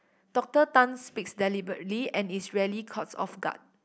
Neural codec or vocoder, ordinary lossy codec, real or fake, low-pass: none; none; real; none